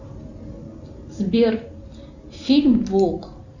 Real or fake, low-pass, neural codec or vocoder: real; 7.2 kHz; none